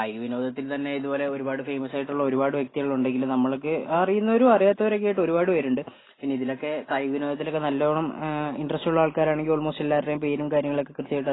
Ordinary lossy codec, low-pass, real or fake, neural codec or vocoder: AAC, 16 kbps; 7.2 kHz; real; none